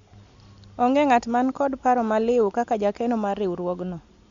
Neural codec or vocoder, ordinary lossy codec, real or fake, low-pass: none; Opus, 64 kbps; real; 7.2 kHz